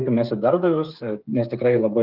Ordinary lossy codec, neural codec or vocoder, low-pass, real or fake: Opus, 32 kbps; codec, 16 kHz, 8 kbps, FreqCodec, smaller model; 5.4 kHz; fake